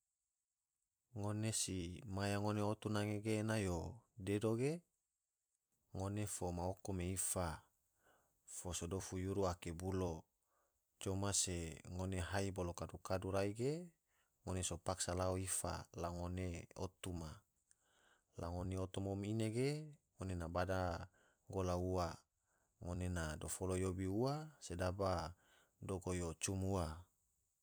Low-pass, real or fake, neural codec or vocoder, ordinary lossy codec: none; fake; vocoder, 44.1 kHz, 128 mel bands every 256 samples, BigVGAN v2; none